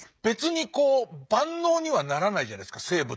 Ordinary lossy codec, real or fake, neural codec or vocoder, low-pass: none; fake; codec, 16 kHz, 16 kbps, FreqCodec, smaller model; none